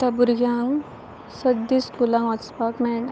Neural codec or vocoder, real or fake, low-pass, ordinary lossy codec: codec, 16 kHz, 8 kbps, FunCodec, trained on Chinese and English, 25 frames a second; fake; none; none